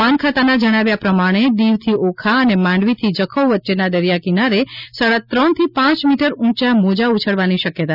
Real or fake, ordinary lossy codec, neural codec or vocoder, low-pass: real; none; none; 5.4 kHz